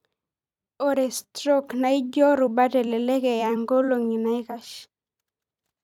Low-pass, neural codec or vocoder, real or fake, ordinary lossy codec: 19.8 kHz; vocoder, 44.1 kHz, 128 mel bands every 256 samples, BigVGAN v2; fake; none